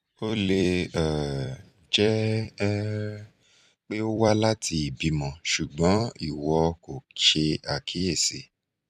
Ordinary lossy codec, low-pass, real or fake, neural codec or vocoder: none; 14.4 kHz; fake; vocoder, 44.1 kHz, 128 mel bands every 256 samples, BigVGAN v2